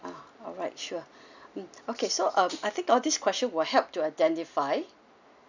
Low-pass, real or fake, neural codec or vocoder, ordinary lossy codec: 7.2 kHz; real; none; none